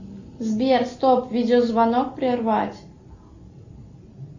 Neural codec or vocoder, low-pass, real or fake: none; 7.2 kHz; real